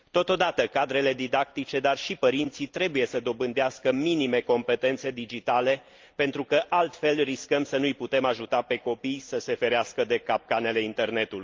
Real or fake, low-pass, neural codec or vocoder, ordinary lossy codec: real; 7.2 kHz; none; Opus, 24 kbps